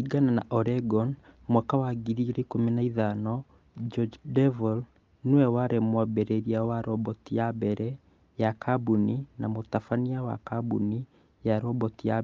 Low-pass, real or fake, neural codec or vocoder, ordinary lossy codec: 7.2 kHz; real; none; Opus, 32 kbps